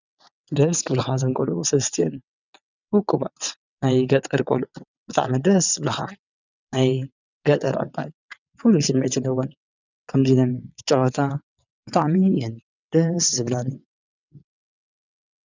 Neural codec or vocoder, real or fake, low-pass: vocoder, 22.05 kHz, 80 mel bands, WaveNeXt; fake; 7.2 kHz